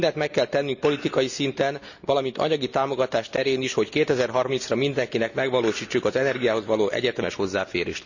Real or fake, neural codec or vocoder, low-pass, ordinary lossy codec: real; none; 7.2 kHz; none